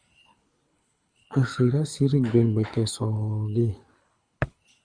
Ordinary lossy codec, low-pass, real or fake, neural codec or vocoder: Opus, 64 kbps; 9.9 kHz; fake; codec, 24 kHz, 6 kbps, HILCodec